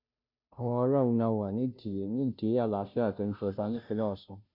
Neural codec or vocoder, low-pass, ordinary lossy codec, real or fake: codec, 16 kHz, 0.5 kbps, FunCodec, trained on Chinese and English, 25 frames a second; 5.4 kHz; none; fake